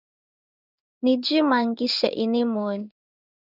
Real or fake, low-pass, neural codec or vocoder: fake; 5.4 kHz; codec, 16 kHz in and 24 kHz out, 1 kbps, XY-Tokenizer